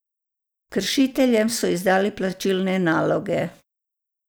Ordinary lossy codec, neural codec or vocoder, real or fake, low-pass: none; none; real; none